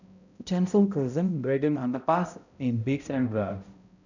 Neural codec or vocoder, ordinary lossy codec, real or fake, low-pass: codec, 16 kHz, 0.5 kbps, X-Codec, HuBERT features, trained on balanced general audio; none; fake; 7.2 kHz